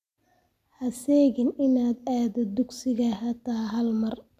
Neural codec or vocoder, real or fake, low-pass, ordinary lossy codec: none; real; 14.4 kHz; AAC, 96 kbps